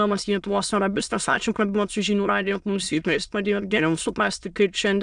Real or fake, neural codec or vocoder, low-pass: fake; autoencoder, 22.05 kHz, a latent of 192 numbers a frame, VITS, trained on many speakers; 9.9 kHz